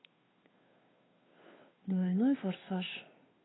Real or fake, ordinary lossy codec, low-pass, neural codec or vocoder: fake; AAC, 16 kbps; 7.2 kHz; codec, 16 kHz, 2 kbps, FunCodec, trained on Chinese and English, 25 frames a second